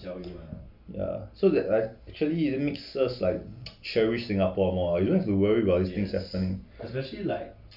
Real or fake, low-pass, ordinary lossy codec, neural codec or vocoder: real; 5.4 kHz; MP3, 48 kbps; none